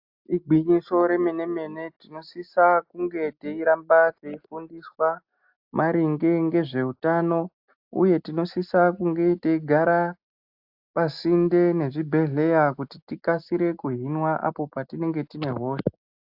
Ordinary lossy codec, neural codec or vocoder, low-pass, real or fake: AAC, 48 kbps; none; 5.4 kHz; real